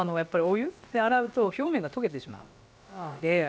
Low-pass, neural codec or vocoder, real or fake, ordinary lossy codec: none; codec, 16 kHz, about 1 kbps, DyCAST, with the encoder's durations; fake; none